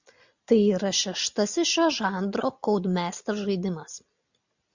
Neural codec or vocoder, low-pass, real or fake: none; 7.2 kHz; real